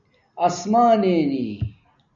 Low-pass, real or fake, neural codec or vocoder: 7.2 kHz; real; none